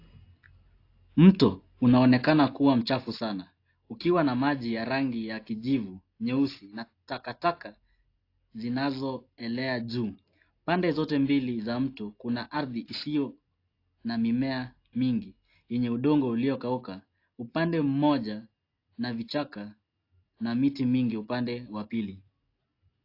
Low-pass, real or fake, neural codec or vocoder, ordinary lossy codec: 5.4 kHz; real; none; AAC, 32 kbps